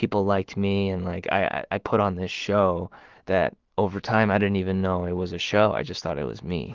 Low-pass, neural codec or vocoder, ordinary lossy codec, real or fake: 7.2 kHz; autoencoder, 48 kHz, 128 numbers a frame, DAC-VAE, trained on Japanese speech; Opus, 16 kbps; fake